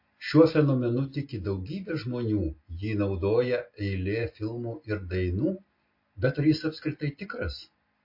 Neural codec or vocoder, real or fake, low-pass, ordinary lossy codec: none; real; 5.4 kHz; MP3, 32 kbps